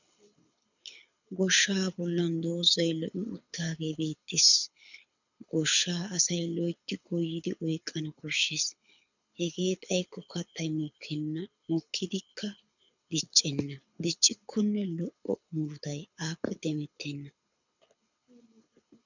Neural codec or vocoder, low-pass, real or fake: codec, 24 kHz, 6 kbps, HILCodec; 7.2 kHz; fake